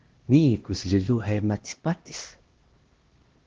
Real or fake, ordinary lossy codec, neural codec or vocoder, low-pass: fake; Opus, 16 kbps; codec, 16 kHz, 1 kbps, X-Codec, HuBERT features, trained on LibriSpeech; 7.2 kHz